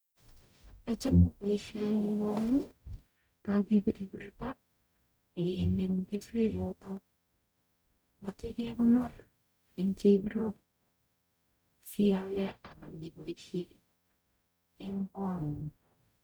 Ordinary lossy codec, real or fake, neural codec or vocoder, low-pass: none; fake; codec, 44.1 kHz, 0.9 kbps, DAC; none